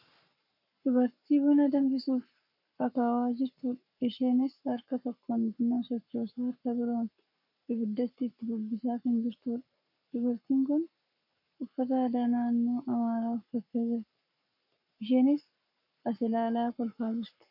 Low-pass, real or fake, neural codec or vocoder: 5.4 kHz; fake; codec, 44.1 kHz, 7.8 kbps, Pupu-Codec